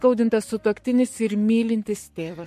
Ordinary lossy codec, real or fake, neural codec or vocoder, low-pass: MP3, 64 kbps; fake; codec, 44.1 kHz, 7.8 kbps, Pupu-Codec; 14.4 kHz